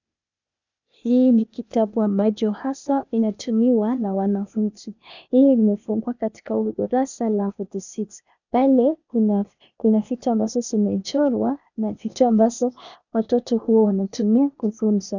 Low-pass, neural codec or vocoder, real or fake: 7.2 kHz; codec, 16 kHz, 0.8 kbps, ZipCodec; fake